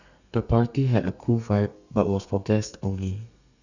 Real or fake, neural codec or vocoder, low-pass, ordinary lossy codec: fake; codec, 32 kHz, 1.9 kbps, SNAC; 7.2 kHz; none